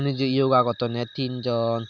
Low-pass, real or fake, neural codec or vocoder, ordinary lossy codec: none; real; none; none